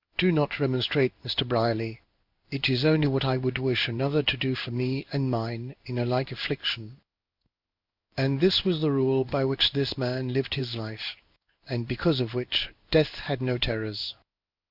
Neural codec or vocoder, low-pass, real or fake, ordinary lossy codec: codec, 16 kHz in and 24 kHz out, 1 kbps, XY-Tokenizer; 5.4 kHz; fake; Opus, 64 kbps